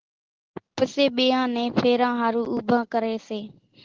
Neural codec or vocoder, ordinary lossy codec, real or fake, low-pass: none; Opus, 16 kbps; real; 7.2 kHz